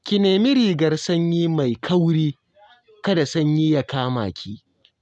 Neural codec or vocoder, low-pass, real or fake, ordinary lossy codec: none; none; real; none